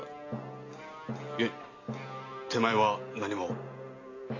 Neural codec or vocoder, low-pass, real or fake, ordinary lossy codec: none; 7.2 kHz; real; AAC, 32 kbps